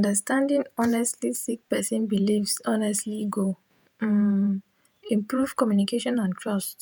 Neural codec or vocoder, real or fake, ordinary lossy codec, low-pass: vocoder, 48 kHz, 128 mel bands, Vocos; fake; none; none